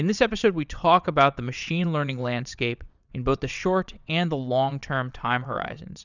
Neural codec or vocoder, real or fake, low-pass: vocoder, 22.05 kHz, 80 mel bands, WaveNeXt; fake; 7.2 kHz